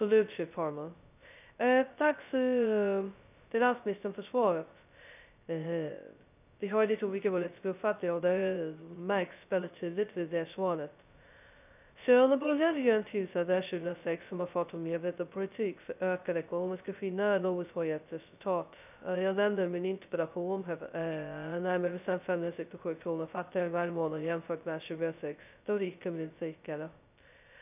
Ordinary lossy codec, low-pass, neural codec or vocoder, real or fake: none; 3.6 kHz; codec, 16 kHz, 0.2 kbps, FocalCodec; fake